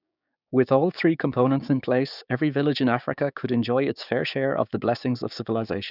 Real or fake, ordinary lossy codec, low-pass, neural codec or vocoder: fake; none; 5.4 kHz; codec, 16 kHz, 6 kbps, DAC